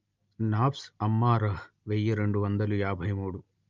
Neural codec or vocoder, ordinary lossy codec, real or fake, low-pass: none; Opus, 32 kbps; real; 7.2 kHz